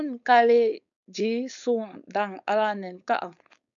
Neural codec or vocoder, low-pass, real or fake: codec, 16 kHz, 4.8 kbps, FACodec; 7.2 kHz; fake